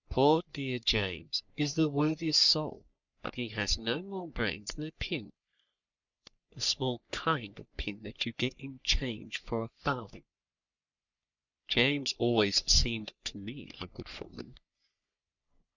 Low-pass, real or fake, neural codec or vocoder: 7.2 kHz; fake; codec, 44.1 kHz, 3.4 kbps, Pupu-Codec